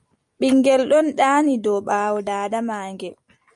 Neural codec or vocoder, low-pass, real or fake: vocoder, 44.1 kHz, 128 mel bands every 256 samples, BigVGAN v2; 10.8 kHz; fake